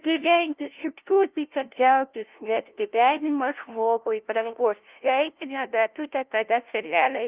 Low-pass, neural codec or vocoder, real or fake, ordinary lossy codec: 3.6 kHz; codec, 16 kHz, 0.5 kbps, FunCodec, trained on LibriTTS, 25 frames a second; fake; Opus, 32 kbps